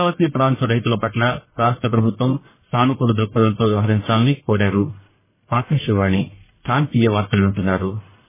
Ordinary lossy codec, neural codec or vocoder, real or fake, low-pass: MP3, 16 kbps; codec, 44.1 kHz, 1.7 kbps, Pupu-Codec; fake; 3.6 kHz